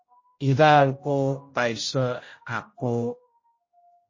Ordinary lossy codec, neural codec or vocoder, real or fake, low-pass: MP3, 32 kbps; codec, 16 kHz, 0.5 kbps, X-Codec, HuBERT features, trained on general audio; fake; 7.2 kHz